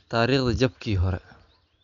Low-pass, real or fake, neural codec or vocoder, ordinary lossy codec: 7.2 kHz; real; none; none